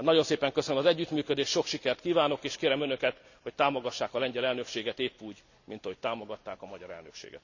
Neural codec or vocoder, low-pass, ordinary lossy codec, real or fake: none; 7.2 kHz; none; real